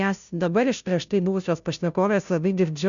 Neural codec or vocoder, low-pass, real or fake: codec, 16 kHz, 0.5 kbps, FunCodec, trained on Chinese and English, 25 frames a second; 7.2 kHz; fake